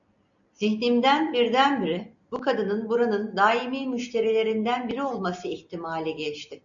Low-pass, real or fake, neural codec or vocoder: 7.2 kHz; real; none